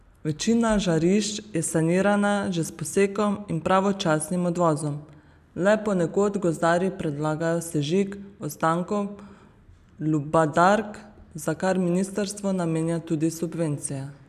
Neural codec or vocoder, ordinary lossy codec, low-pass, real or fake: none; none; 14.4 kHz; real